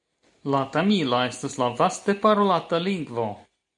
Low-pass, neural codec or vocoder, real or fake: 10.8 kHz; none; real